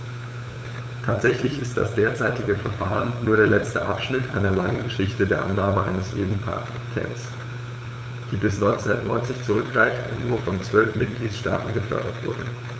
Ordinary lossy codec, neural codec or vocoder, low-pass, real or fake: none; codec, 16 kHz, 8 kbps, FunCodec, trained on LibriTTS, 25 frames a second; none; fake